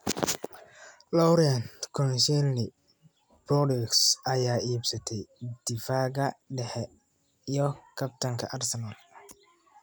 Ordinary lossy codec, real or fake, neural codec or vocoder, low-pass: none; real; none; none